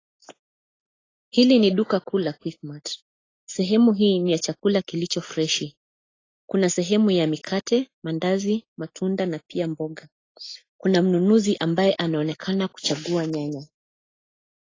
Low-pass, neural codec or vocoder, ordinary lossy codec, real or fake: 7.2 kHz; none; AAC, 32 kbps; real